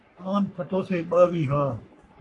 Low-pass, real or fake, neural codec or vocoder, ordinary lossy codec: 10.8 kHz; fake; codec, 44.1 kHz, 3.4 kbps, Pupu-Codec; AAC, 48 kbps